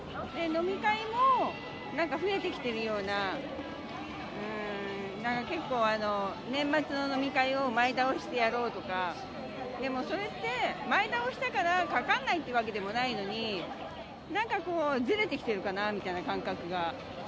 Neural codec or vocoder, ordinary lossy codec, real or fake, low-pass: none; none; real; none